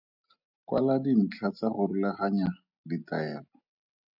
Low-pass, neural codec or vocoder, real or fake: 5.4 kHz; none; real